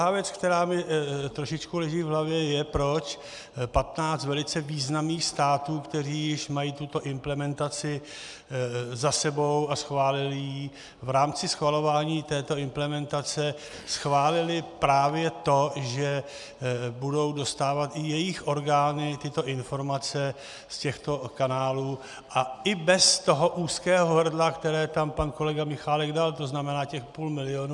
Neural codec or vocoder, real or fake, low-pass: none; real; 10.8 kHz